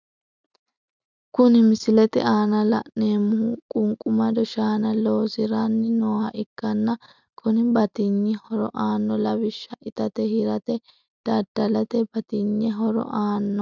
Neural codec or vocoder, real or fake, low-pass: none; real; 7.2 kHz